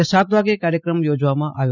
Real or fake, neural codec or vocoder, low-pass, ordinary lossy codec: real; none; 7.2 kHz; none